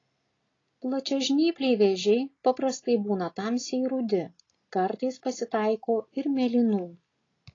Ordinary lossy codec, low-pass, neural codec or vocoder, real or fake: AAC, 32 kbps; 7.2 kHz; none; real